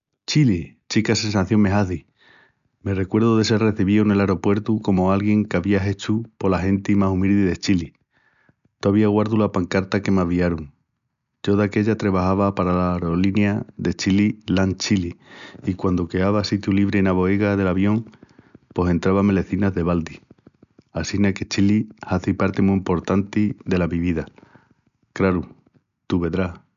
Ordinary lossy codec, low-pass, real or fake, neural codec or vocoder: MP3, 96 kbps; 7.2 kHz; real; none